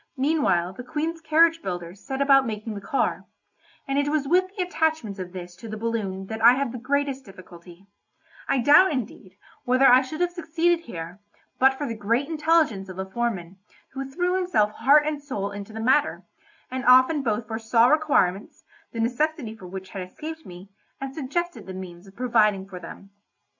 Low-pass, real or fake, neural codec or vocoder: 7.2 kHz; real; none